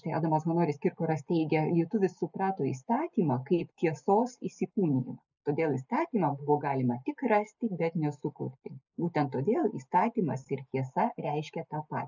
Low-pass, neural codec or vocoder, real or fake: 7.2 kHz; none; real